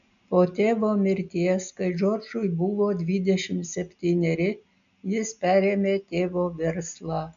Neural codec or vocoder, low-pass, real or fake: none; 7.2 kHz; real